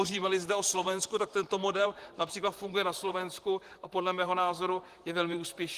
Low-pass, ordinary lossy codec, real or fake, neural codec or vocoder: 14.4 kHz; Opus, 24 kbps; fake; vocoder, 44.1 kHz, 128 mel bands, Pupu-Vocoder